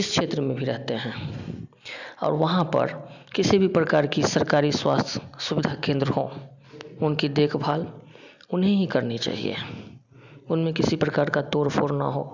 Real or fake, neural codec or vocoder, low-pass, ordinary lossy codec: real; none; 7.2 kHz; none